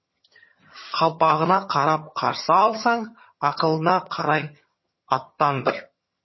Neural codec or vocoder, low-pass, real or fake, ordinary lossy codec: vocoder, 22.05 kHz, 80 mel bands, HiFi-GAN; 7.2 kHz; fake; MP3, 24 kbps